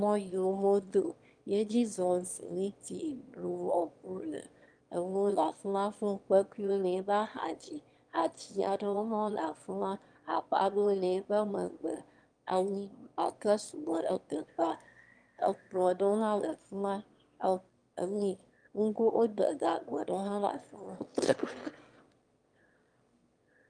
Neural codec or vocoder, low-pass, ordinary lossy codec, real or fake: autoencoder, 22.05 kHz, a latent of 192 numbers a frame, VITS, trained on one speaker; 9.9 kHz; Opus, 32 kbps; fake